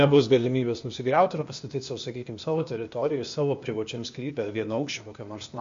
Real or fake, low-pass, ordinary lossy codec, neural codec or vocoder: fake; 7.2 kHz; MP3, 48 kbps; codec, 16 kHz, 0.8 kbps, ZipCodec